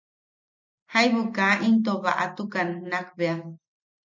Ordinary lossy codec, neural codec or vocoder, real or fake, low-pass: MP3, 64 kbps; none; real; 7.2 kHz